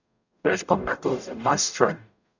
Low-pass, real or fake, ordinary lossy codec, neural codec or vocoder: 7.2 kHz; fake; none; codec, 44.1 kHz, 0.9 kbps, DAC